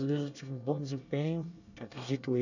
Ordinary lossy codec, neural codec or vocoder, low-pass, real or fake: none; codec, 24 kHz, 1 kbps, SNAC; 7.2 kHz; fake